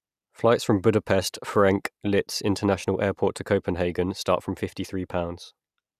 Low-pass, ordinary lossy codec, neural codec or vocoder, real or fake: 14.4 kHz; none; none; real